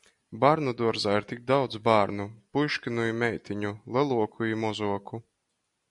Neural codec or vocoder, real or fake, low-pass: none; real; 10.8 kHz